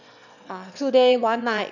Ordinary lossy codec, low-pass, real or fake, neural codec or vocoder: none; 7.2 kHz; fake; autoencoder, 22.05 kHz, a latent of 192 numbers a frame, VITS, trained on one speaker